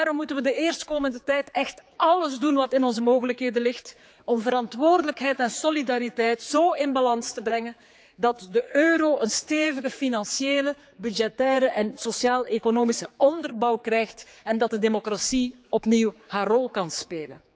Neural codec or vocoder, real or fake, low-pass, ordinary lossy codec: codec, 16 kHz, 4 kbps, X-Codec, HuBERT features, trained on general audio; fake; none; none